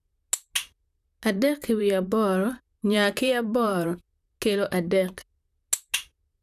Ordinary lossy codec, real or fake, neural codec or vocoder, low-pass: none; fake; vocoder, 44.1 kHz, 128 mel bands, Pupu-Vocoder; 14.4 kHz